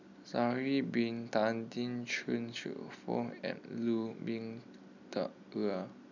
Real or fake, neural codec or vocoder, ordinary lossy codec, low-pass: real; none; none; 7.2 kHz